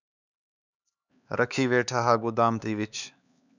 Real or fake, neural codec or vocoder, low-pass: fake; codec, 16 kHz, 4 kbps, X-Codec, HuBERT features, trained on LibriSpeech; 7.2 kHz